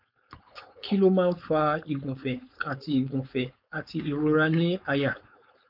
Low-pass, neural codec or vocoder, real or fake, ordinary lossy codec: 5.4 kHz; codec, 16 kHz, 4.8 kbps, FACodec; fake; none